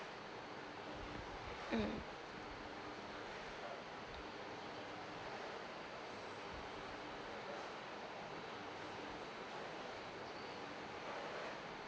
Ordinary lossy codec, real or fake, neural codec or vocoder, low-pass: none; real; none; none